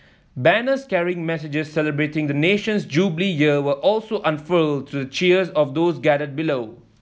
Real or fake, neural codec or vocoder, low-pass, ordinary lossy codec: real; none; none; none